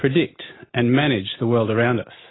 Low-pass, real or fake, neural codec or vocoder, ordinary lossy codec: 7.2 kHz; real; none; AAC, 16 kbps